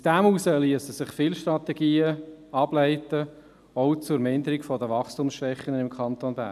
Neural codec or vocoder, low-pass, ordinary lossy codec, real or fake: none; 14.4 kHz; none; real